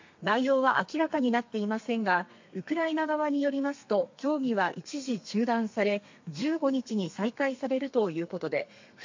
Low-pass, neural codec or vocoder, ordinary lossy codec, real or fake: 7.2 kHz; codec, 32 kHz, 1.9 kbps, SNAC; MP3, 48 kbps; fake